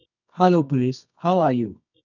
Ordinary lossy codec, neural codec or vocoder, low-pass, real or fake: none; codec, 24 kHz, 0.9 kbps, WavTokenizer, medium music audio release; 7.2 kHz; fake